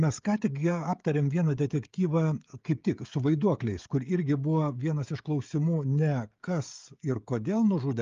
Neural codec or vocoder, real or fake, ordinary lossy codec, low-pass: codec, 16 kHz, 16 kbps, FreqCodec, smaller model; fake; Opus, 32 kbps; 7.2 kHz